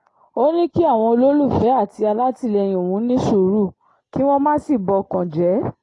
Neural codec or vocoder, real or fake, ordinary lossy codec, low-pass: none; real; AAC, 32 kbps; 10.8 kHz